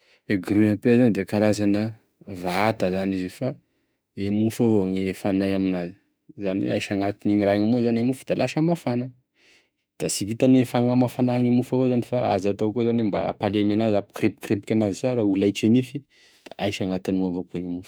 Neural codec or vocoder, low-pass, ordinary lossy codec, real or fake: autoencoder, 48 kHz, 32 numbers a frame, DAC-VAE, trained on Japanese speech; none; none; fake